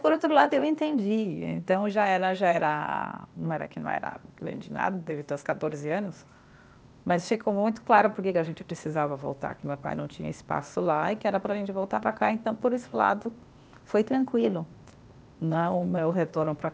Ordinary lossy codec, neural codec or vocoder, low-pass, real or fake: none; codec, 16 kHz, 0.8 kbps, ZipCodec; none; fake